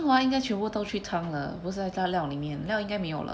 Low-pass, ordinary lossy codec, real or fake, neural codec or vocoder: none; none; real; none